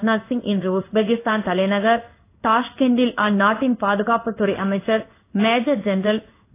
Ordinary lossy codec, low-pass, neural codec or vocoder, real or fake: AAC, 24 kbps; 3.6 kHz; codec, 16 kHz in and 24 kHz out, 1 kbps, XY-Tokenizer; fake